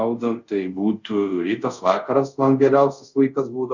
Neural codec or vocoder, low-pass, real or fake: codec, 24 kHz, 0.5 kbps, DualCodec; 7.2 kHz; fake